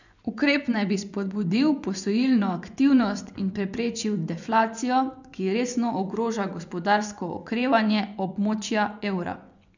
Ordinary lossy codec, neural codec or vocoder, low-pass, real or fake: none; vocoder, 24 kHz, 100 mel bands, Vocos; 7.2 kHz; fake